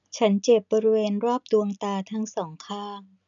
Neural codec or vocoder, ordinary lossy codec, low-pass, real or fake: none; none; 7.2 kHz; real